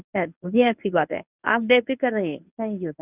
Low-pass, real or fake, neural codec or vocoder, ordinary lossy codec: 3.6 kHz; fake; codec, 24 kHz, 0.9 kbps, WavTokenizer, medium speech release version 1; none